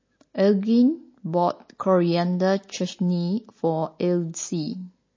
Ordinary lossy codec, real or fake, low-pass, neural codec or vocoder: MP3, 32 kbps; real; 7.2 kHz; none